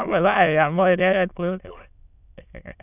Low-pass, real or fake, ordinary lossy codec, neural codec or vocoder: 3.6 kHz; fake; none; autoencoder, 22.05 kHz, a latent of 192 numbers a frame, VITS, trained on many speakers